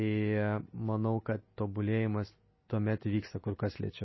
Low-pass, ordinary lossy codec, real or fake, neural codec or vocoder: 7.2 kHz; MP3, 24 kbps; real; none